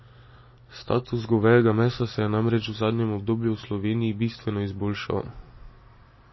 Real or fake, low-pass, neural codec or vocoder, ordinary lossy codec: real; 7.2 kHz; none; MP3, 24 kbps